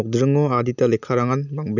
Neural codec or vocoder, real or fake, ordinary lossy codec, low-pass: none; real; none; 7.2 kHz